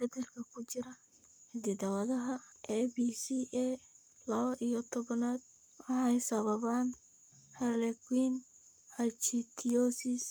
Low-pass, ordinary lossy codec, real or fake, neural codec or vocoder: none; none; fake; codec, 44.1 kHz, 7.8 kbps, Pupu-Codec